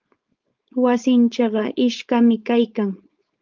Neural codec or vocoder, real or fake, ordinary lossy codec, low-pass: codec, 16 kHz, 4.8 kbps, FACodec; fake; Opus, 24 kbps; 7.2 kHz